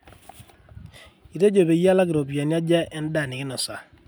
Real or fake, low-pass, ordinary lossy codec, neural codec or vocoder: real; none; none; none